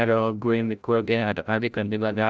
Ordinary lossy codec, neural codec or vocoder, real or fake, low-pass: none; codec, 16 kHz, 0.5 kbps, FreqCodec, larger model; fake; none